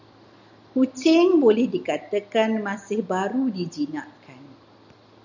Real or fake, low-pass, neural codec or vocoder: real; 7.2 kHz; none